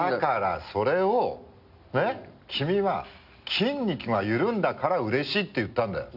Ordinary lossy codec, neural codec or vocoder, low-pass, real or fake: none; none; 5.4 kHz; real